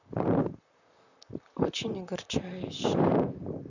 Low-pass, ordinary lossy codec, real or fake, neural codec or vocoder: 7.2 kHz; none; fake; vocoder, 44.1 kHz, 128 mel bands, Pupu-Vocoder